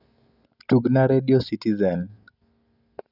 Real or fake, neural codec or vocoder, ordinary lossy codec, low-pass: real; none; none; 5.4 kHz